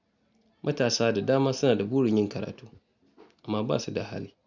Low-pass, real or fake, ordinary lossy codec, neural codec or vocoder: 7.2 kHz; real; none; none